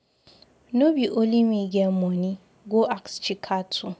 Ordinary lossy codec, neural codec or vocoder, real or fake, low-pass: none; none; real; none